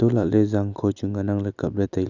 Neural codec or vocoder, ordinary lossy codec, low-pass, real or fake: none; none; 7.2 kHz; real